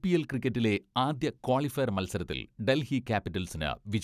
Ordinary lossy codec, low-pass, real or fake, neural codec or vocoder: none; 14.4 kHz; real; none